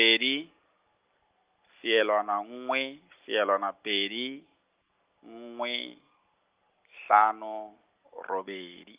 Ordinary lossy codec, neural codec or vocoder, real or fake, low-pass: Opus, 32 kbps; none; real; 3.6 kHz